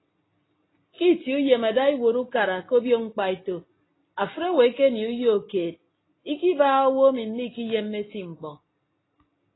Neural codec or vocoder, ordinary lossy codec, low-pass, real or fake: none; AAC, 16 kbps; 7.2 kHz; real